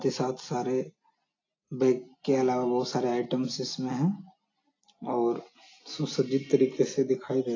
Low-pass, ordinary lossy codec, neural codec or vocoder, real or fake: 7.2 kHz; AAC, 32 kbps; none; real